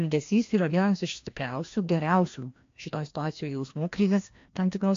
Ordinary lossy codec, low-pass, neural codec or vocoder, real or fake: AAC, 48 kbps; 7.2 kHz; codec, 16 kHz, 1 kbps, FreqCodec, larger model; fake